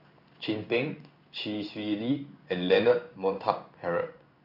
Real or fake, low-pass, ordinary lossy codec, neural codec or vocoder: fake; 5.4 kHz; none; codec, 16 kHz in and 24 kHz out, 1 kbps, XY-Tokenizer